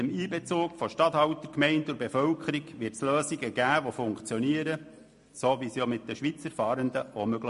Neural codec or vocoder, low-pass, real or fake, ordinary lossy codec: none; 14.4 kHz; real; MP3, 48 kbps